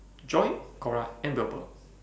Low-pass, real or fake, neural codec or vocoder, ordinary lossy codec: none; real; none; none